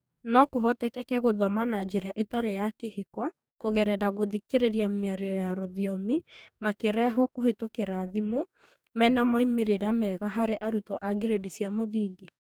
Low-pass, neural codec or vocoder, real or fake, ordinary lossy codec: none; codec, 44.1 kHz, 2.6 kbps, DAC; fake; none